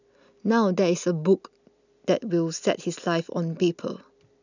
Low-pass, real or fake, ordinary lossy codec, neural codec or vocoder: 7.2 kHz; real; none; none